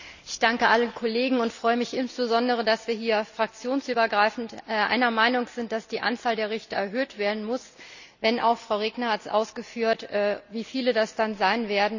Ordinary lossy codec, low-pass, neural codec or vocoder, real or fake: none; 7.2 kHz; none; real